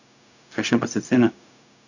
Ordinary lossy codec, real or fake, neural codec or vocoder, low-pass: none; fake; codec, 16 kHz, 0.4 kbps, LongCat-Audio-Codec; 7.2 kHz